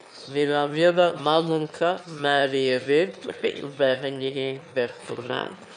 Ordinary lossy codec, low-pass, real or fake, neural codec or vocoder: none; 9.9 kHz; fake; autoencoder, 22.05 kHz, a latent of 192 numbers a frame, VITS, trained on one speaker